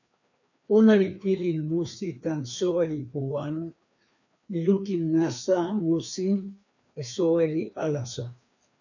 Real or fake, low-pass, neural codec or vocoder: fake; 7.2 kHz; codec, 16 kHz, 2 kbps, FreqCodec, larger model